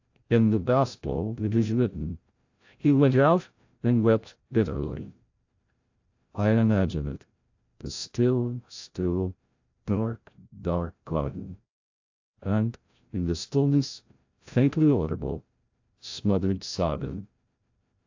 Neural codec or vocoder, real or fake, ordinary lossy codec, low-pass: codec, 16 kHz, 0.5 kbps, FreqCodec, larger model; fake; AAC, 48 kbps; 7.2 kHz